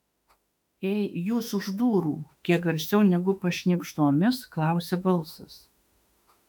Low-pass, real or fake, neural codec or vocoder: 19.8 kHz; fake; autoencoder, 48 kHz, 32 numbers a frame, DAC-VAE, trained on Japanese speech